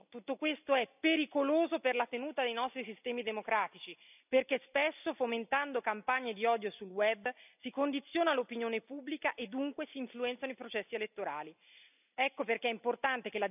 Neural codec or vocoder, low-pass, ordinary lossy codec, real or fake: none; 3.6 kHz; none; real